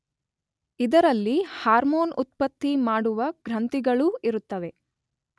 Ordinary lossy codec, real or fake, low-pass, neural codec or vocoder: none; real; none; none